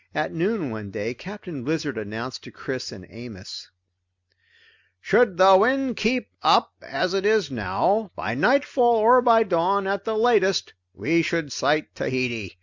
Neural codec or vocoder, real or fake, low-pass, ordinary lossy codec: none; real; 7.2 kHz; MP3, 64 kbps